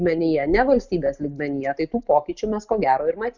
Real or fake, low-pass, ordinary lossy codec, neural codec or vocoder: real; 7.2 kHz; Opus, 64 kbps; none